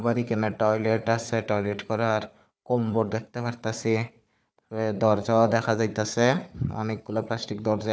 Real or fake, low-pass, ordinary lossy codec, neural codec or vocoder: fake; none; none; codec, 16 kHz, 4 kbps, FunCodec, trained on Chinese and English, 50 frames a second